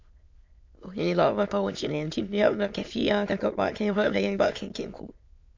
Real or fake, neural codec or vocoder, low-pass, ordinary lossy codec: fake; autoencoder, 22.05 kHz, a latent of 192 numbers a frame, VITS, trained on many speakers; 7.2 kHz; MP3, 48 kbps